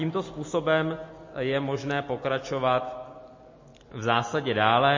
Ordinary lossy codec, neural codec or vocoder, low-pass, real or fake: MP3, 32 kbps; none; 7.2 kHz; real